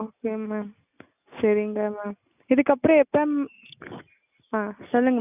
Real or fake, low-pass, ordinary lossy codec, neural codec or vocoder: real; 3.6 kHz; none; none